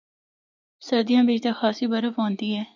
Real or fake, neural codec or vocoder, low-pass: real; none; 7.2 kHz